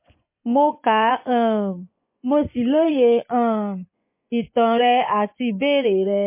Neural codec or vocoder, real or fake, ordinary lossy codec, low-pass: vocoder, 24 kHz, 100 mel bands, Vocos; fake; MP3, 24 kbps; 3.6 kHz